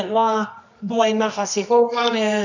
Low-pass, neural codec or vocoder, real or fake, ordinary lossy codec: 7.2 kHz; codec, 24 kHz, 0.9 kbps, WavTokenizer, medium music audio release; fake; none